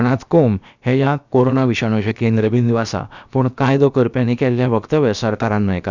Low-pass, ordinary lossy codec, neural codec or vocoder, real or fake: 7.2 kHz; none; codec, 16 kHz, about 1 kbps, DyCAST, with the encoder's durations; fake